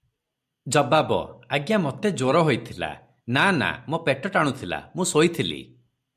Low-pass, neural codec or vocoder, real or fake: 14.4 kHz; none; real